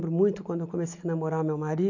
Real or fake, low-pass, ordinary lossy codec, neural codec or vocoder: real; 7.2 kHz; none; none